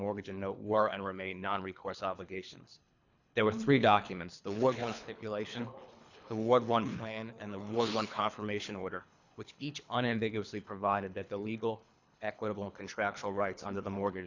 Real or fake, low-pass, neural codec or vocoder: fake; 7.2 kHz; codec, 24 kHz, 3 kbps, HILCodec